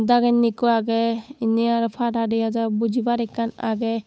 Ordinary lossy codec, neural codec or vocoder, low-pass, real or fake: none; codec, 16 kHz, 8 kbps, FunCodec, trained on Chinese and English, 25 frames a second; none; fake